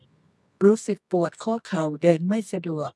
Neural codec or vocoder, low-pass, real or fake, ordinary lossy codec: codec, 24 kHz, 0.9 kbps, WavTokenizer, medium music audio release; none; fake; none